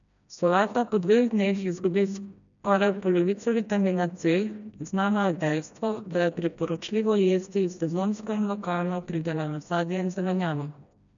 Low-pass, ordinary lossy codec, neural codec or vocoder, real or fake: 7.2 kHz; none; codec, 16 kHz, 1 kbps, FreqCodec, smaller model; fake